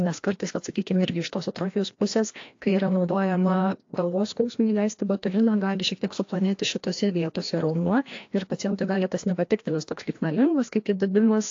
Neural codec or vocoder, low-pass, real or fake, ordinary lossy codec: codec, 16 kHz, 1 kbps, FreqCodec, larger model; 7.2 kHz; fake; AAC, 48 kbps